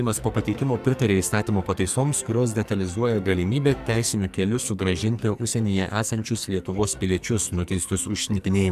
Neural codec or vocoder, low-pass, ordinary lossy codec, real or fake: codec, 44.1 kHz, 2.6 kbps, SNAC; 14.4 kHz; AAC, 96 kbps; fake